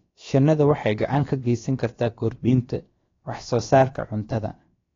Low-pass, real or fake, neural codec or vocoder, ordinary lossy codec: 7.2 kHz; fake; codec, 16 kHz, about 1 kbps, DyCAST, with the encoder's durations; AAC, 32 kbps